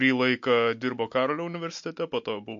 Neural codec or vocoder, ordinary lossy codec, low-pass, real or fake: none; MP3, 48 kbps; 7.2 kHz; real